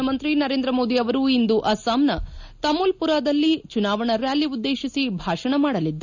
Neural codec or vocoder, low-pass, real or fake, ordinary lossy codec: none; 7.2 kHz; real; none